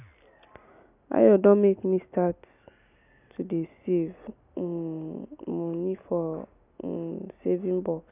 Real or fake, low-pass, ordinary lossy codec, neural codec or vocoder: real; 3.6 kHz; none; none